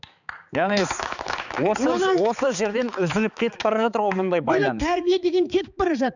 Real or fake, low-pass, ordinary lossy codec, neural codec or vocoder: fake; 7.2 kHz; none; codec, 16 kHz, 4 kbps, X-Codec, HuBERT features, trained on balanced general audio